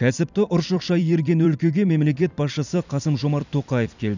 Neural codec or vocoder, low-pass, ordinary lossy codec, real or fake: vocoder, 44.1 kHz, 128 mel bands every 256 samples, BigVGAN v2; 7.2 kHz; none; fake